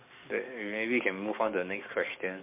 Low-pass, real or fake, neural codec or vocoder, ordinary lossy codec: 3.6 kHz; fake; codec, 44.1 kHz, 7.8 kbps, DAC; MP3, 32 kbps